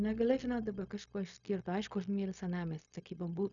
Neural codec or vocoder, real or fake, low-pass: codec, 16 kHz, 0.4 kbps, LongCat-Audio-Codec; fake; 7.2 kHz